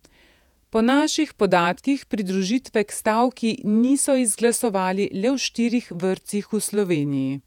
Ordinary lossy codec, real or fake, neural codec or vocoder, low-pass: none; fake; vocoder, 48 kHz, 128 mel bands, Vocos; 19.8 kHz